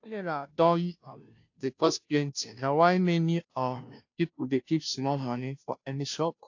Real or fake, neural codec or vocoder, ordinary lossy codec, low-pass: fake; codec, 16 kHz, 0.5 kbps, FunCodec, trained on Chinese and English, 25 frames a second; AAC, 48 kbps; 7.2 kHz